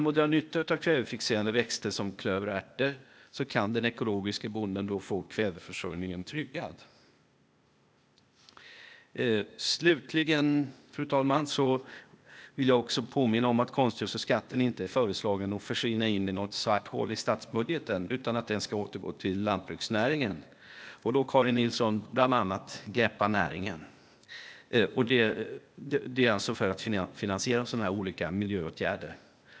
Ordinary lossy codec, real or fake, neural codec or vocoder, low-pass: none; fake; codec, 16 kHz, 0.8 kbps, ZipCodec; none